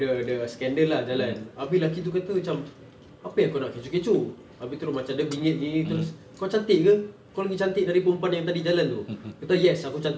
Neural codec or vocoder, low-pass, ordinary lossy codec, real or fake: none; none; none; real